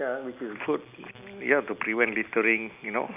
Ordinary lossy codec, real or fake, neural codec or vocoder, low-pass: none; real; none; 3.6 kHz